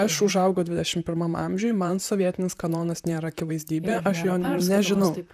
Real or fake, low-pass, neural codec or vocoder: fake; 14.4 kHz; vocoder, 44.1 kHz, 128 mel bands, Pupu-Vocoder